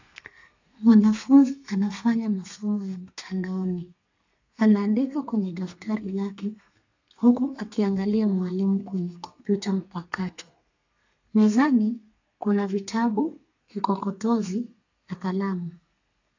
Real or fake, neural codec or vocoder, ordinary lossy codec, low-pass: fake; codec, 32 kHz, 1.9 kbps, SNAC; AAC, 48 kbps; 7.2 kHz